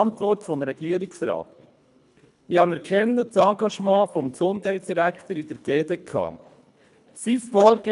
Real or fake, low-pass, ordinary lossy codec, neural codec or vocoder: fake; 10.8 kHz; none; codec, 24 kHz, 1.5 kbps, HILCodec